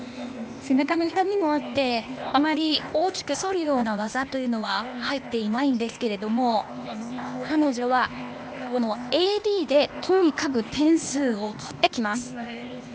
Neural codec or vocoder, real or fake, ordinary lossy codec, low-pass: codec, 16 kHz, 0.8 kbps, ZipCodec; fake; none; none